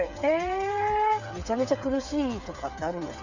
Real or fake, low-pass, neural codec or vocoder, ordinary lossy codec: fake; 7.2 kHz; codec, 16 kHz, 8 kbps, FreqCodec, smaller model; none